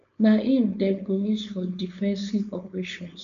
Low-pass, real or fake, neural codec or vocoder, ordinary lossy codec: 7.2 kHz; fake; codec, 16 kHz, 4.8 kbps, FACodec; none